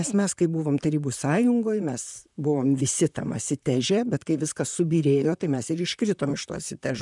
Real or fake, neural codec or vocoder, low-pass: fake; vocoder, 44.1 kHz, 128 mel bands, Pupu-Vocoder; 10.8 kHz